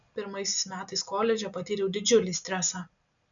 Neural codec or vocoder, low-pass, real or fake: none; 7.2 kHz; real